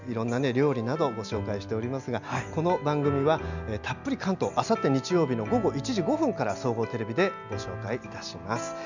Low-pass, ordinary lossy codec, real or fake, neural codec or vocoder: 7.2 kHz; none; real; none